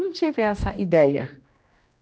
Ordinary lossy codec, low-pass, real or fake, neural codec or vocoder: none; none; fake; codec, 16 kHz, 1 kbps, X-Codec, HuBERT features, trained on general audio